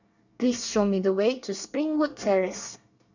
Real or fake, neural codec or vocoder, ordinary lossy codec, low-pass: fake; codec, 24 kHz, 1 kbps, SNAC; none; 7.2 kHz